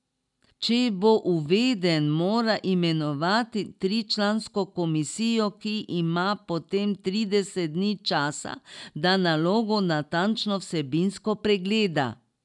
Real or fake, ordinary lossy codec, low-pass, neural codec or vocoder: real; none; 10.8 kHz; none